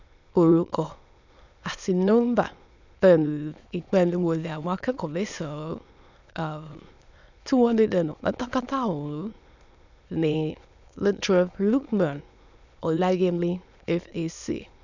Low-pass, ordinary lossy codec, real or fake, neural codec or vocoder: 7.2 kHz; none; fake; autoencoder, 22.05 kHz, a latent of 192 numbers a frame, VITS, trained on many speakers